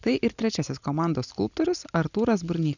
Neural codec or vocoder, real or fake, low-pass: none; real; 7.2 kHz